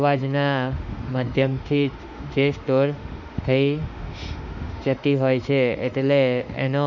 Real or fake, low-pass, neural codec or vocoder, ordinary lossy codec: fake; 7.2 kHz; autoencoder, 48 kHz, 32 numbers a frame, DAC-VAE, trained on Japanese speech; none